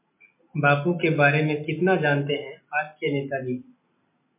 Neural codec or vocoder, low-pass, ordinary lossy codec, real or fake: none; 3.6 kHz; MP3, 24 kbps; real